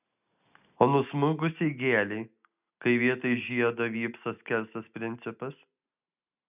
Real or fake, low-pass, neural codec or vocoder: real; 3.6 kHz; none